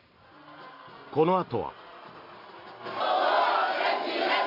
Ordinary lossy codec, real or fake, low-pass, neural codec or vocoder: MP3, 48 kbps; real; 5.4 kHz; none